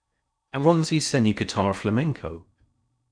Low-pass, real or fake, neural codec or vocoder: 9.9 kHz; fake; codec, 16 kHz in and 24 kHz out, 0.6 kbps, FocalCodec, streaming, 4096 codes